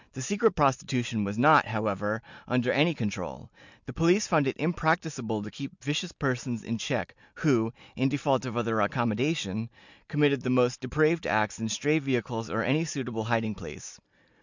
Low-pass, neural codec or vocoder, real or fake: 7.2 kHz; none; real